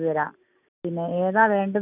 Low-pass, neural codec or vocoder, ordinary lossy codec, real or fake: 3.6 kHz; none; none; real